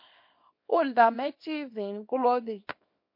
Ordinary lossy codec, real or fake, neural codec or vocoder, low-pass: MP3, 32 kbps; fake; codec, 24 kHz, 0.9 kbps, WavTokenizer, small release; 5.4 kHz